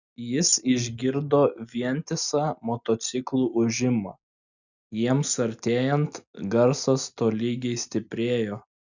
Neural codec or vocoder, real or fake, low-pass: none; real; 7.2 kHz